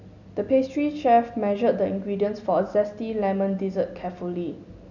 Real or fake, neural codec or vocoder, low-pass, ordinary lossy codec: real; none; 7.2 kHz; none